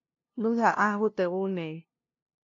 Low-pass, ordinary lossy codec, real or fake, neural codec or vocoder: 7.2 kHz; AAC, 48 kbps; fake; codec, 16 kHz, 0.5 kbps, FunCodec, trained on LibriTTS, 25 frames a second